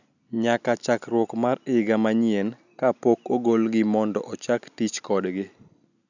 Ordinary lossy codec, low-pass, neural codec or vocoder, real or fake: none; 7.2 kHz; none; real